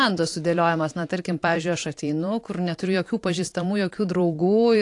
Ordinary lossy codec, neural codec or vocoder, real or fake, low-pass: AAC, 48 kbps; vocoder, 44.1 kHz, 128 mel bands every 512 samples, BigVGAN v2; fake; 10.8 kHz